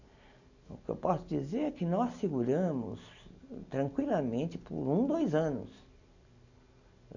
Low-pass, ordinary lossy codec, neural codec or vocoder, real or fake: 7.2 kHz; none; none; real